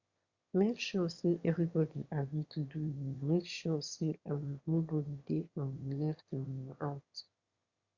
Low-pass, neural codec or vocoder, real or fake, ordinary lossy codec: 7.2 kHz; autoencoder, 22.05 kHz, a latent of 192 numbers a frame, VITS, trained on one speaker; fake; Opus, 64 kbps